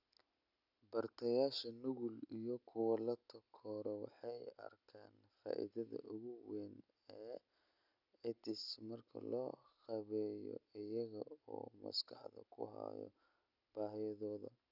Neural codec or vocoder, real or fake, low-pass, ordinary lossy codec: none; real; 5.4 kHz; none